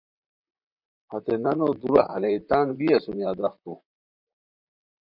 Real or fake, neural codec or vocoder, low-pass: fake; vocoder, 44.1 kHz, 128 mel bands, Pupu-Vocoder; 5.4 kHz